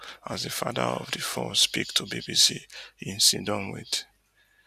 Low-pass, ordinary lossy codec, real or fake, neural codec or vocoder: 14.4 kHz; none; real; none